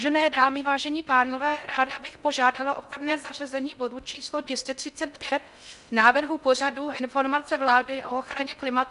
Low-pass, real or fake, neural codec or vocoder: 10.8 kHz; fake; codec, 16 kHz in and 24 kHz out, 0.6 kbps, FocalCodec, streaming, 4096 codes